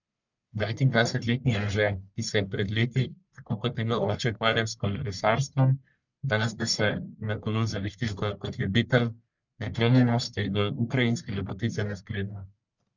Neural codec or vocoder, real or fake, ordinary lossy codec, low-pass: codec, 44.1 kHz, 1.7 kbps, Pupu-Codec; fake; none; 7.2 kHz